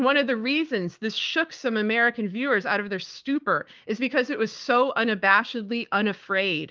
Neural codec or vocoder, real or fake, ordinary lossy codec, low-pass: codec, 16 kHz, 6 kbps, DAC; fake; Opus, 24 kbps; 7.2 kHz